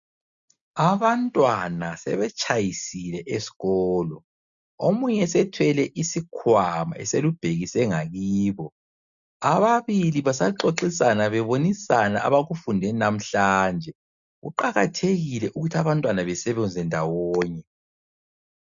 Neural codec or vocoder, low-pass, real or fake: none; 7.2 kHz; real